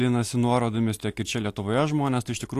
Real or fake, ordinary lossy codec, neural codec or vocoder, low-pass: real; AAC, 64 kbps; none; 14.4 kHz